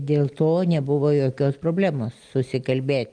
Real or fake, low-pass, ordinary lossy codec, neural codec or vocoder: real; 9.9 kHz; Opus, 32 kbps; none